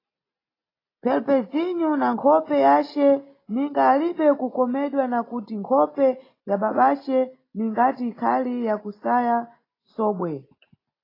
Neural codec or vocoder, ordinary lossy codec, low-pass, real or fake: none; AAC, 24 kbps; 5.4 kHz; real